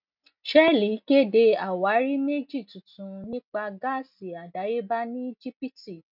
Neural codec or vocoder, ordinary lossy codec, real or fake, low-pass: none; none; real; 5.4 kHz